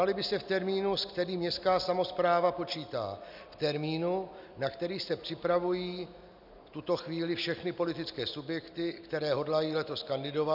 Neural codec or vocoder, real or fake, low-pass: none; real; 5.4 kHz